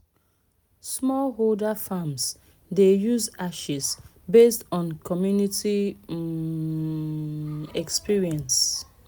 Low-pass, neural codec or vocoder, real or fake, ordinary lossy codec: none; none; real; none